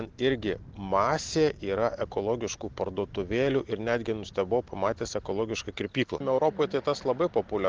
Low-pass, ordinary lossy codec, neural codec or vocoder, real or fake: 7.2 kHz; Opus, 16 kbps; none; real